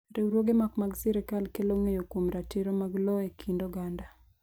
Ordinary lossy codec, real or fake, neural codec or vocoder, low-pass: none; real; none; none